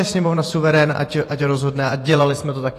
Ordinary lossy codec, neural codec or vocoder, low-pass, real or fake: AAC, 48 kbps; vocoder, 48 kHz, 128 mel bands, Vocos; 14.4 kHz; fake